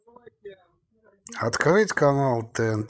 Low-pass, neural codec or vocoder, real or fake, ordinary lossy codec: none; codec, 16 kHz, 16 kbps, FreqCodec, larger model; fake; none